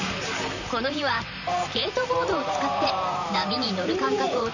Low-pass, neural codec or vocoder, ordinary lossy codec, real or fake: 7.2 kHz; vocoder, 44.1 kHz, 128 mel bands, Pupu-Vocoder; none; fake